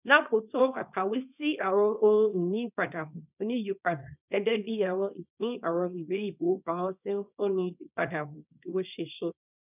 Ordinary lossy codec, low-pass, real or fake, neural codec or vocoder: none; 3.6 kHz; fake; codec, 24 kHz, 0.9 kbps, WavTokenizer, small release